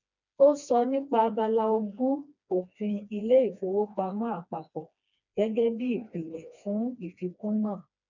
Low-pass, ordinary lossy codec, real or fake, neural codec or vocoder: 7.2 kHz; none; fake; codec, 16 kHz, 2 kbps, FreqCodec, smaller model